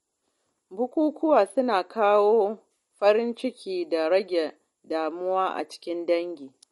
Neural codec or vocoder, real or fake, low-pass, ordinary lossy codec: none; real; 19.8 kHz; MP3, 48 kbps